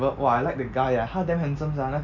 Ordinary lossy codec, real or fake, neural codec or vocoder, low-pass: none; real; none; 7.2 kHz